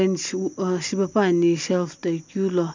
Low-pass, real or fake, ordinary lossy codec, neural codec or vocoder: 7.2 kHz; real; MP3, 64 kbps; none